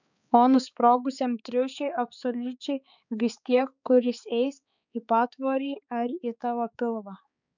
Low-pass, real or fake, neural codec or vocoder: 7.2 kHz; fake; codec, 16 kHz, 4 kbps, X-Codec, HuBERT features, trained on balanced general audio